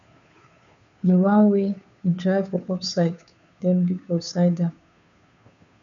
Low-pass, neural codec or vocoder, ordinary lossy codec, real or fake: 7.2 kHz; codec, 16 kHz, 2 kbps, FunCodec, trained on Chinese and English, 25 frames a second; MP3, 96 kbps; fake